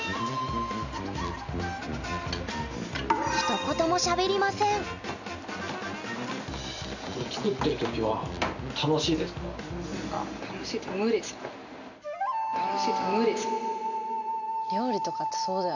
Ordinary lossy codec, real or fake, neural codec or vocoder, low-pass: none; real; none; 7.2 kHz